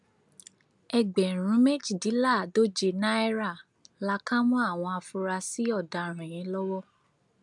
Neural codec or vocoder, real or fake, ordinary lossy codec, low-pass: none; real; none; 10.8 kHz